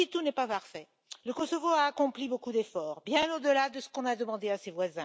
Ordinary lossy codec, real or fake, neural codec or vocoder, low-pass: none; real; none; none